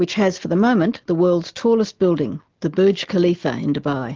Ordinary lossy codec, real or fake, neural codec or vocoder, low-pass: Opus, 16 kbps; real; none; 7.2 kHz